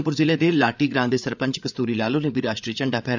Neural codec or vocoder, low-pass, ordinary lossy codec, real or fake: codec, 16 kHz, 8 kbps, FreqCodec, larger model; 7.2 kHz; none; fake